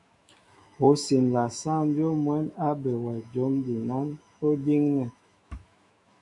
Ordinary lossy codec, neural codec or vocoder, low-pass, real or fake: MP3, 96 kbps; autoencoder, 48 kHz, 128 numbers a frame, DAC-VAE, trained on Japanese speech; 10.8 kHz; fake